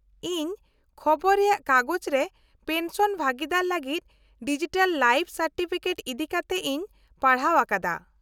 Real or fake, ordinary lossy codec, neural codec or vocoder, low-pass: real; none; none; 19.8 kHz